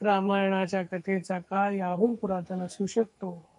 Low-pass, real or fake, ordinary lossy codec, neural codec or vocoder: 10.8 kHz; fake; MP3, 64 kbps; codec, 44.1 kHz, 2.6 kbps, SNAC